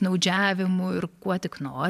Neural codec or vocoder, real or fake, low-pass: vocoder, 44.1 kHz, 128 mel bands every 512 samples, BigVGAN v2; fake; 14.4 kHz